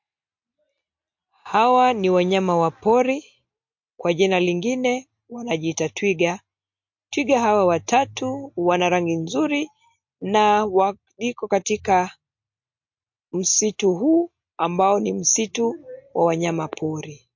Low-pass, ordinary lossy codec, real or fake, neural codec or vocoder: 7.2 kHz; MP3, 48 kbps; real; none